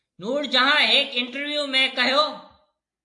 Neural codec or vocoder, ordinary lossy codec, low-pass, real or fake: none; AAC, 64 kbps; 10.8 kHz; real